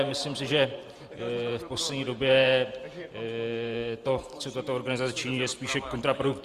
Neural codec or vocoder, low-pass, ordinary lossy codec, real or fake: vocoder, 44.1 kHz, 128 mel bands every 512 samples, BigVGAN v2; 14.4 kHz; Opus, 32 kbps; fake